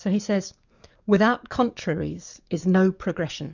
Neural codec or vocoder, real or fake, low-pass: codec, 44.1 kHz, 7.8 kbps, DAC; fake; 7.2 kHz